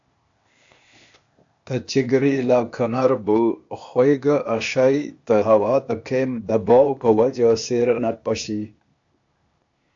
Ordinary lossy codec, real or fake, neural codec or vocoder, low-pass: AAC, 64 kbps; fake; codec, 16 kHz, 0.8 kbps, ZipCodec; 7.2 kHz